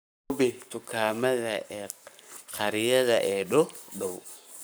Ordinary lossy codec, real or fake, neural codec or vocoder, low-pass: none; fake; codec, 44.1 kHz, 7.8 kbps, Pupu-Codec; none